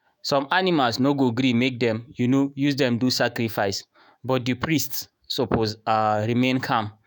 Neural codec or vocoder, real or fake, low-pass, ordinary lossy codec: autoencoder, 48 kHz, 128 numbers a frame, DAC-VAE, trained on Japanese speech; fake; none; none